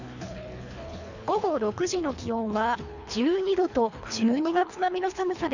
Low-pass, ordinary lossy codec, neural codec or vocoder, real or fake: 7.2 kHz; none; codec, 24 kHz, 3 kbps, HILCodec; fake